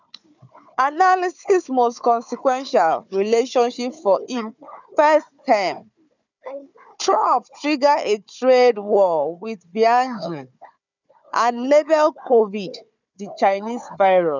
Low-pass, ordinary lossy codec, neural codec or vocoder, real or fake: 7.2 kHz; none; codec, 16 kHz, 4 kbps, FunCodec, trained on Chinese and English, 50 frames a second; fake